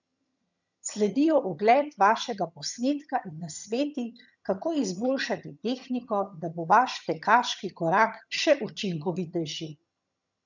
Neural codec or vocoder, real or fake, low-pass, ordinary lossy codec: vocoder, 22.05 kHz, 80 mel bands, HiFi-GAN; fake; 7.2 kHz; none